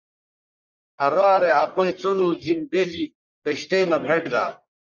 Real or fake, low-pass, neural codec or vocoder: fake; 7.2 kHz; codec, 44.1 kHz, 1.7 kbps, Pupu-Codec